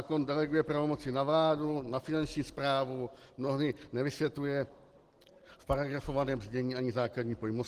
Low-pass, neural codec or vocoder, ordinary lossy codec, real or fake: 14.4 kHz; none; Opus, 16 kbps; real